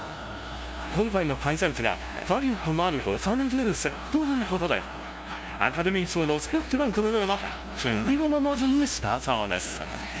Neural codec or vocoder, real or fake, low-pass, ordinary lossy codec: codec, 16 kHz, 0.5 kbps, FunCodec, trained on LibriTTS, 25 frames a second; fake; none; none